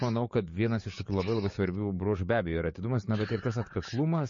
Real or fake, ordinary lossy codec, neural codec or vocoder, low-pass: fake; MP3, 32 kbps; codec, 16 kHz, 6 kbps, DAC; 7.2 kHz